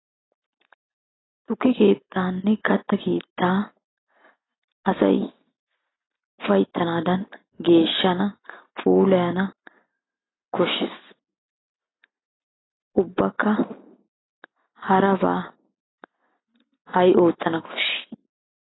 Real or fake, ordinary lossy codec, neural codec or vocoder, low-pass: real; AAC, 16 kbps; none; 7.2 kHz